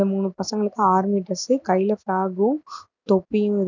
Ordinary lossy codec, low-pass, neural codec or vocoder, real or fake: none; 7.2 kHz; none; real